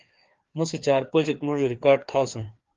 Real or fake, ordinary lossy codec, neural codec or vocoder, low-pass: fake; Opus, 32 kbps; codec, 16 kHz, 2 kbps, FreqCodec, larger model; 7.2 kHz